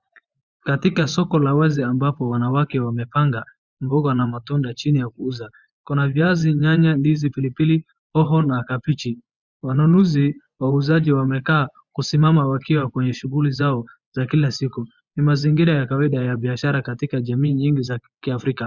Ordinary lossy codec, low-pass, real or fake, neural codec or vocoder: Opus, 64 kbps; 7.2 kHz; fake; vocoder, 24 kHz, 100 mel bands, Vocos